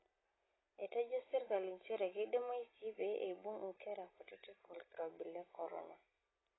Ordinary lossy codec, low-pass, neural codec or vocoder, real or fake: AAC, 16 kbps; 7.2 kHz; none; real